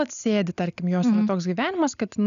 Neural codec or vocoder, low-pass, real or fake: none; 7.2 kHz; real